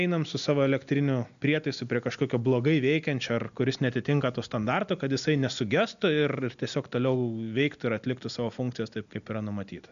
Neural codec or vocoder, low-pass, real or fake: none; 7.2 kHz; real